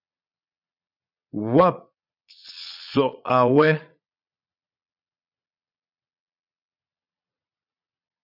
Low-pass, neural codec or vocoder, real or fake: 5.4 kHz; vocoder, 22.05 kHz, 80 mel bands, Vocos; fake